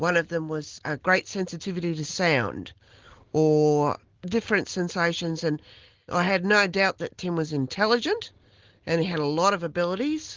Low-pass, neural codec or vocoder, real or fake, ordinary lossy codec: 7.2 kHz; none; real; Opus, 16 kbps